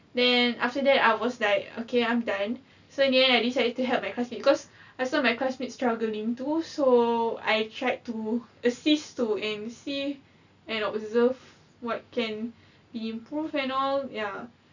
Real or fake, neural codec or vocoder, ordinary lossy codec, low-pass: real; none; none; 7.2 kHz